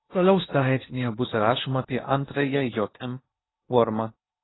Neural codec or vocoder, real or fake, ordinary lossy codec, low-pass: codec, 16 kHz in and 24 kHz out, 0.8 kbps, FocalCodec, streaming, 65536 codes; fake; AAC, 16 kbps; 7.2 kHz